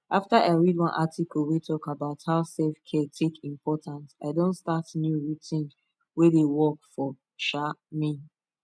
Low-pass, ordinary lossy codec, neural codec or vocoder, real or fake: none; none; none; real